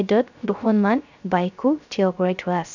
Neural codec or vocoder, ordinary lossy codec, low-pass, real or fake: codec, 16 kHz, 0.3 kbps, FocalCodec; none; 7.2 kHz; fake